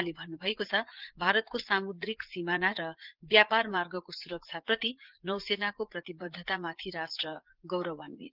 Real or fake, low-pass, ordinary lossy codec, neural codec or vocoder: fake; 5.4 kHz; Opus, 16 kbps; vocoder, 44.1 kHz, 80 mel bands, Vocos